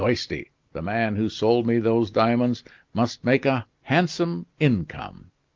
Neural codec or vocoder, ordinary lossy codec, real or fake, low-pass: none; Opus, 32 kbps; real; 7.2 kHz